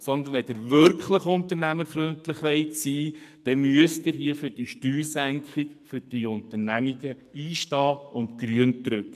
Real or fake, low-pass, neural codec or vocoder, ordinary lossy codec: fake; 14.4 kHz; codec, 44.1 kHz, 2.6 kbps, SNAC; MP3, 96 kbps